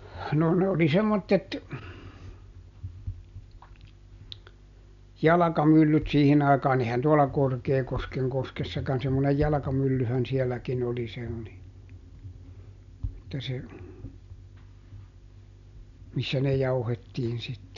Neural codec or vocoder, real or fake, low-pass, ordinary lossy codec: none; real; 7.2 kHz; none